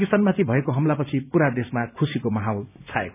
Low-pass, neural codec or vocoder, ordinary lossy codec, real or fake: 3.6 kHz; none; none; real